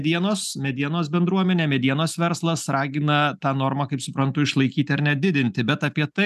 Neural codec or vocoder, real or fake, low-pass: none; real; 14.4 kHz